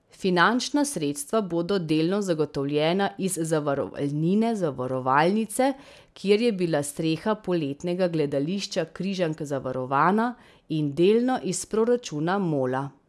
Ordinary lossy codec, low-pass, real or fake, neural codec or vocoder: none; none; real; none